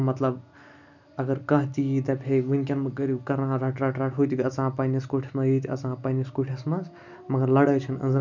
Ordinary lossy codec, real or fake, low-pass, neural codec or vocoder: none; real; 7.2 kHz; none